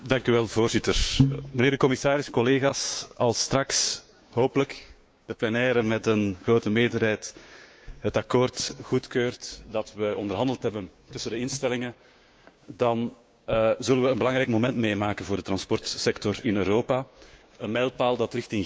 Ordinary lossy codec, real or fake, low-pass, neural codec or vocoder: none; fake; none; codec, 16 kHz, 6 kbps, DAC